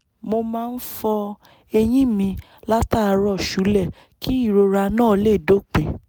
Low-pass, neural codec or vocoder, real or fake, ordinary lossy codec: none; none; real; none